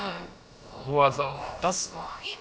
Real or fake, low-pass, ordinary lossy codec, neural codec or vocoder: fake; none; none; codec, 16 kHz, about 1 kbps, DyCAST, with the encoder's durations